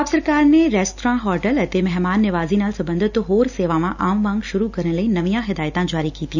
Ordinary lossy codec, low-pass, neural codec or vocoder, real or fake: none; 7.2 kHz; none; real